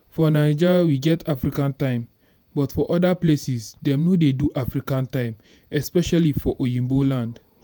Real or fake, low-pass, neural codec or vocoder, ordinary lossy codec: fake; none; vocoder, 48 kHz, 128 mel bands, Vocos; none